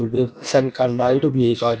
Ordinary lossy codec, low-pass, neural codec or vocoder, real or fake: none; none; codec, 16 kHz, about 1 kbps, DyCAST, with the encoder's durations; fake